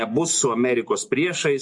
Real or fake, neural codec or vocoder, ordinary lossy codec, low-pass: real; none; MP3, 48 kbps; 10.8 kHz